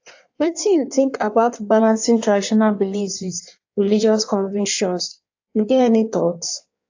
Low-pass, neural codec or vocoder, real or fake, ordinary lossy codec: 7.2 kHz; codec, 16 kHz in and 24 kHz out, 1.1 kbps, FireRedTTS-2 codec; fake; AAC, 48 kbps